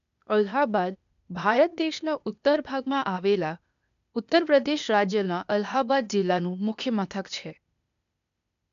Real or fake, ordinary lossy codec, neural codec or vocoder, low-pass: fake; AAC, 96 kbps; codec, 16 kHz, 0.8 kbps, ZipCodec; 7.2 kHz